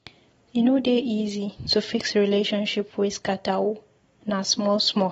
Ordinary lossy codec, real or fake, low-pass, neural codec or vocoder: AAC, 24 kbps; real; 19.8 kHz; none